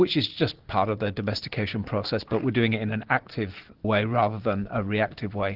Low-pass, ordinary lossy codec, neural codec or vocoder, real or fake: 5.4 kHz; Opus, 16 kbps; none; real